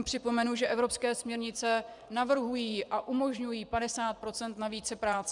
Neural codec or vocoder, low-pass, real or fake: vocoder, 24 kHz, 100 mel bands, Vocos; 10.8 kHz; fake